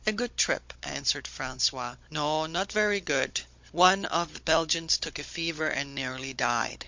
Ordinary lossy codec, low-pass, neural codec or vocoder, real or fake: MP3, 48 kbps; 7.2 kHz; codec, 16 kHz, 16 kbps, FunCodec, trained on Chinese and English, 50 frames a second; fake